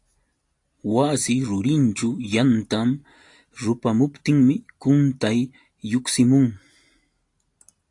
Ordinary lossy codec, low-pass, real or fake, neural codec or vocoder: AAC, 48 kbps; 10.8 kHz; real; none